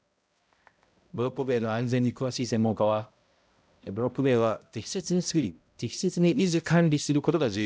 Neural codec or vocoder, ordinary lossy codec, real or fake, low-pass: codec, 16 kHz, 0.5 kbps, X-Codec, HuBERT features, trained on balanced general audio; none; fake; none